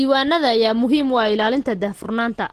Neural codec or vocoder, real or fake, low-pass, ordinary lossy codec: none; real; 14.4 kHz; Opus, 16 kbps